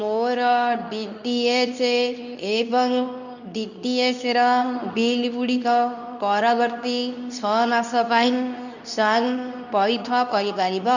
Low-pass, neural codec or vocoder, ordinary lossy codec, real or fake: 7.2 kHz; codec, 24 kHz, 0.9 kbps, WavTokenizer, medium speech release version 2; none; fake